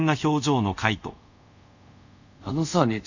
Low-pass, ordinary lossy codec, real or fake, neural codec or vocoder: 7.2 kHz; none; fake; codec, 24 kHz, 0.5 kbps, DualCodec